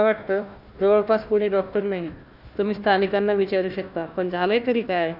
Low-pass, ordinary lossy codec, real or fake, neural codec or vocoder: 5.4 kHz; none; fake; codec, 16 kHz, 1 kbps, FunCodec, trained on Chinese and English, 50 frames a second